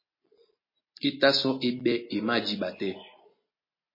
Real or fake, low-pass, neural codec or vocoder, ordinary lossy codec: real; 5.4 kHz; none; MP3, 24 kbps